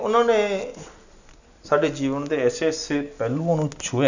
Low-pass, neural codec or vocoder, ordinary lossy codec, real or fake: 7.2 kHz; none; none; real